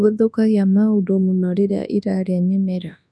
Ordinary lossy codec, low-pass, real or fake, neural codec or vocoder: none; none; fake; codec, 24 kHz, 0.9 kbps, WavTokenizer, large speech release